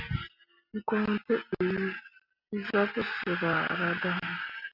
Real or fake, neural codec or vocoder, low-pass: real; none; 5.4 kHz